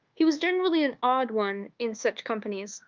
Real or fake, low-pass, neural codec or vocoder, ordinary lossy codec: fake; 7.2 kHz; codec, 24 kHz, 1.2 kbps, DualCodec; Opus, 32 kbps